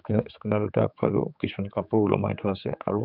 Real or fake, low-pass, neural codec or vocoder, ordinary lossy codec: fake; 5.4 kHz; codec, 16 kHz, 4 kbps, X-Codec, HuBERT features, trained on general audio; none